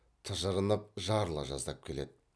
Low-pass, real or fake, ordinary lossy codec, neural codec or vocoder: none; real; none; none